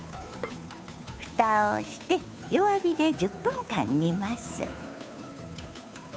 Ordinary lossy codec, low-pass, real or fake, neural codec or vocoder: none; none; fake; codec, 16 kHz, 2 kbps, FunCodec, trained on Chinese and English, 25 frames a second